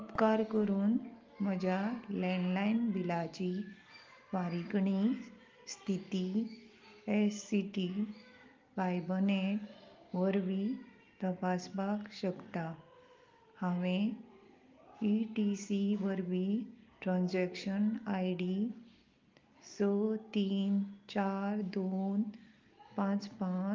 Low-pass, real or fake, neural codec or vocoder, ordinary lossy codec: 7.2 kHz; real; none; Opus, 24 kbps